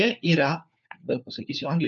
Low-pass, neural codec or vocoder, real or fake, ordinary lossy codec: 7.2 kHz; codec, 16 kHz, 4 kbps, FunCodec, trained on LibriTTS, 50 frames a second; fake; MP3, 64 kbps